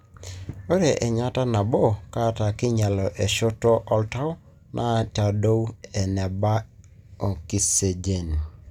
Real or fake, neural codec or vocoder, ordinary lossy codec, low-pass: real; none; none; 19.8 kHz